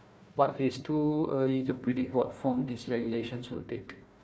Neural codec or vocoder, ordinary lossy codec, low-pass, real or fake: codec, 16 kHz, 1 kbps, FunCodec, trained on Chinese and English, 50 frames a second; none; none; fake